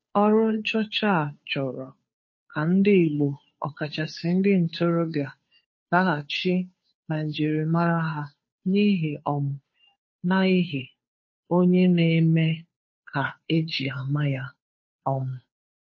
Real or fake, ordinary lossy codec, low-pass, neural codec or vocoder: fake; MP3, 32 kbps; 7.2 kHz; codec, 16 kHz, 2 kbps, FunCodec, trained on Chinese and English, 25 frames a second